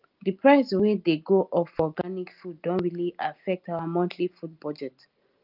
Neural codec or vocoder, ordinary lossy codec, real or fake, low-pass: none; Opus, 32 kbps; real; 5.4 kHz